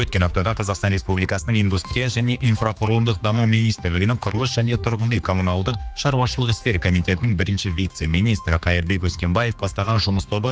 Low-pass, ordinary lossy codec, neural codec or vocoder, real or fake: none; none; codec, 16 kHz, 2 kbps, X-Codec, HuBERT features, trained on general audio; fake